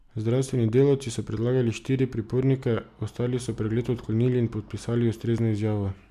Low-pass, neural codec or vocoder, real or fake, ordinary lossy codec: 14.4 kHz; none; real; none